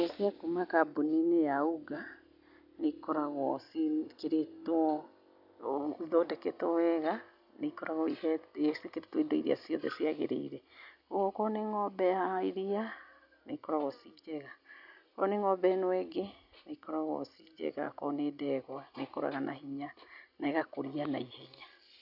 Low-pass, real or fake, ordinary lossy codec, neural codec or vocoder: 5.4 kHz; real; none; none